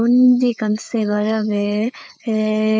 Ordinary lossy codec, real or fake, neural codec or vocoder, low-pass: none; fake; codec, 16 kHz, 4 kbps, FreqCodec, larger model; none